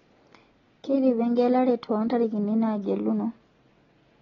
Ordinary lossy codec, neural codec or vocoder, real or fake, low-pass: AAC, 24 kbps; none; real; 7.2 kHz